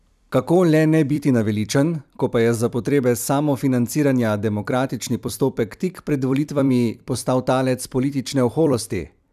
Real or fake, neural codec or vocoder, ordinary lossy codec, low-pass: fake; vocoder, 44.1 kHz, 128 mel bands every 256 samples, BigVGAN v2; none; 14.4 kHz